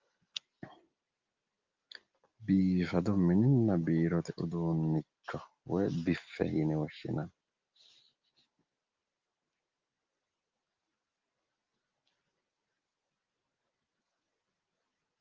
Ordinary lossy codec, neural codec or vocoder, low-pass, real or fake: Opus, 32 kbps; none; 7.2 kHz; real